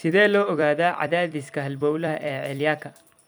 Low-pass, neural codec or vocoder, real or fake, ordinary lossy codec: none; vocoder, 44.1 kHz, 128 mel bands every 256 samples, BigVGAN v2; fake; none